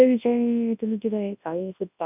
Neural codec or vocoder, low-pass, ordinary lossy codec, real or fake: codec, 24 kHz, 0.9 kbps, WavTokenizer, large speech release; 3.6 kHz; none; fake